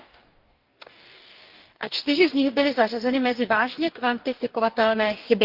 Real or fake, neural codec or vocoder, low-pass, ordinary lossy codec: fake; codec, 44.1 kHz, 2.6 kbps, DAC; 5.4 kHz; Opus, 32 kbps